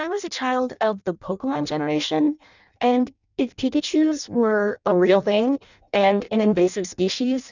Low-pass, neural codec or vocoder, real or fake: 7.2 kHz; codec, 16 kHz in and 24 kHz out, 0.6 kbps, FireRedTTS-2 codec; fake